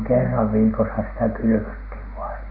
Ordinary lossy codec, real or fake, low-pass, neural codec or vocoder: none; real; 5.4 kHz; none